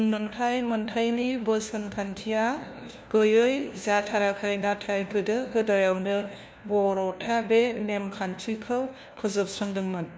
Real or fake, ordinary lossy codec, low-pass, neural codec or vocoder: fake; none; none; codec, 16 kHz, 1 kbps, FunCodec, trained on LibriTTS, 50 frames a second